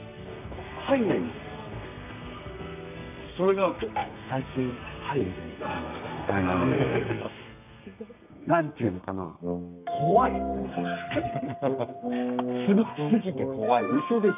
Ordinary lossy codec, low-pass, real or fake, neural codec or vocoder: none; 3.6 kHz; fake; codec, 32 kHz, 1.9 kbps, SNAC